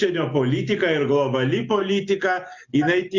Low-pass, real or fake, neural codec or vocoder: 7.2 kHz; real; none